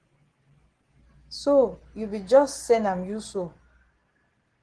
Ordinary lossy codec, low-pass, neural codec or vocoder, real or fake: Opus, 16 kbps; 9.9 kHz; none; real